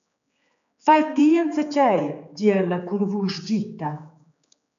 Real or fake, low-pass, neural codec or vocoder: fake; 7.2 kHz; codec, 16 kHz, 4 kbps, X-Codec, HuBERT features, trained on general audio